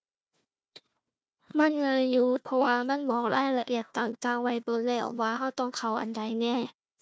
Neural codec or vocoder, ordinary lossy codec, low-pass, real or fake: codec, 16 kHz, 1 kbps, FunCodec, trained on Chinese and English, 50 frames a second; none; none; fake